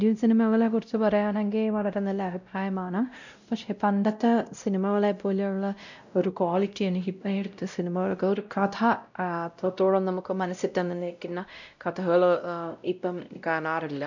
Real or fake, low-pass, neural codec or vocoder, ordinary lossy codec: fake; 7.2 kHz; codec, 16 kHz, 0.5 kbps, X-Codec, WavLM features, trained on Multilingual LibriSpeech; none